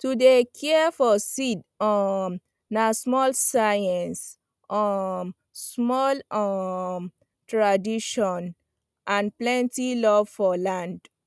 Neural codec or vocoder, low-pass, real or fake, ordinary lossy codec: none; none; real; none